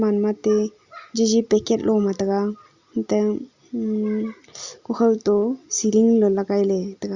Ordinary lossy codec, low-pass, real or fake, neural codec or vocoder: Opus, 64 kbps; 7.2 kHz; real; none